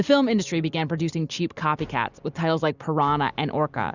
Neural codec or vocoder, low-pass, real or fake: none; 7.2 kHz; real